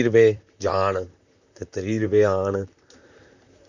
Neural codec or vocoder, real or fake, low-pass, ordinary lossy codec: vocoder, 44.1 kHz, 128 mel bands, Pupu-Vocoder; fake; 7.2 kHz; none